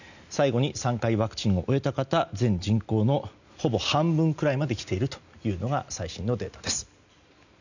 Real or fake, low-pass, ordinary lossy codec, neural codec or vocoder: real; 7.2 kHz; none; none